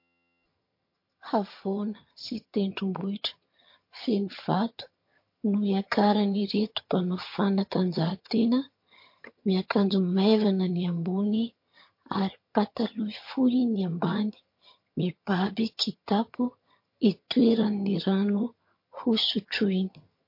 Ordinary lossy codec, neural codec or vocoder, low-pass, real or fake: MP3, 32 kbps; vocoder, 22.05 kHz, 80 mel bands, HiFi-GAN; 5.4 kHz; fake